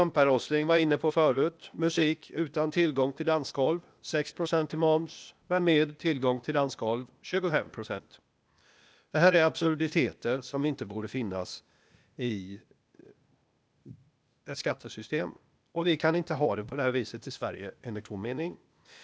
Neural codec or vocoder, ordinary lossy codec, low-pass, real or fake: codec, 16 kHz, 0.8 kbps, ZipCodec; none; none; fake